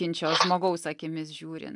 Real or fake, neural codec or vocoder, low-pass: real; none; 10.8 kHz